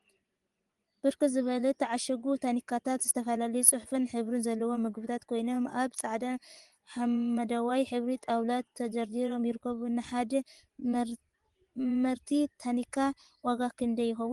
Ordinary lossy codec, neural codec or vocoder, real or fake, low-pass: Opus, 24 kbps; vocoder, 24 kHz, 100 mel bands, Vocos; fake; 10.8 kHz